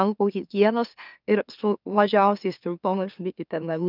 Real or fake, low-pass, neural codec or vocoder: fake; 5.4 kHz; autoencoder, 44.1 kHz, a latent of 192 numbers a frame, MeloTTS